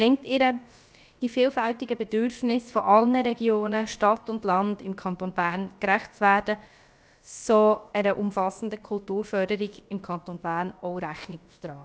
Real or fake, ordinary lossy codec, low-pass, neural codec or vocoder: fake; none; none; codec, 16 kHz, about 1 kbps, DyCAST, with the encoder's durations